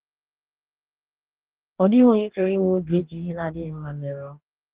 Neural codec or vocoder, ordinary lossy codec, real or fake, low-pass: codec, 44.1 kHz, 2.6 kbps, DAC; Opus, 16 kbps; fake; 3.6 kHz